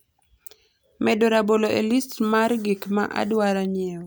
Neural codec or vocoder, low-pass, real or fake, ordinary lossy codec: none; none; real; none